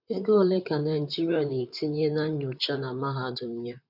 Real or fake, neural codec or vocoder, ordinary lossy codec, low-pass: fake; vocoder, 44.1 kHz, 128 mel bands, Pupu-Vocoder; none; 5.4 kHz